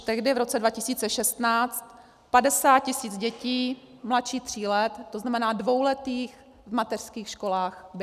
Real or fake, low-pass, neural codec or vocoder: real; 14.4 kHz; none